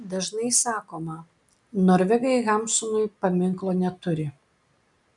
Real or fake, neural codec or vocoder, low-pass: real; none; 10.8 kHz